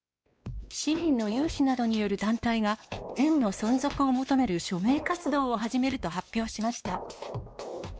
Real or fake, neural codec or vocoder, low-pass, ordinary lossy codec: fake; codec, 16 kHz, 2 kbps, X-Codec, WavLM features, trained on Multilingual LibriSpeech; none; none